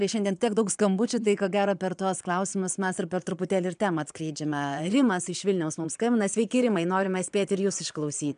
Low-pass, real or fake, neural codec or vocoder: 9.9 kHz; fake; vocoder, 22.05 kHz, 80 mel bands, Vocos